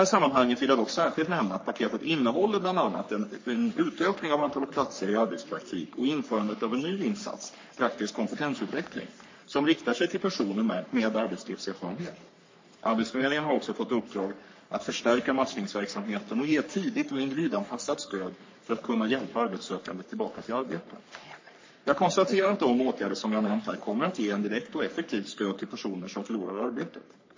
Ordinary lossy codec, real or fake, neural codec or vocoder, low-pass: MP3, 32 kbps; fake; codec, 44.1 kHz, 3.4 kbps, Pupu-Codec; 7.2 kHz